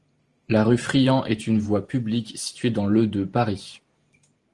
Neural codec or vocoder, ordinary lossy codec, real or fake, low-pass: vocoder, 44.1 kHz, 128 mel bands every 512 samples, BigVGAN v2; Opus, 24 kbps; fake; 10.8 kHz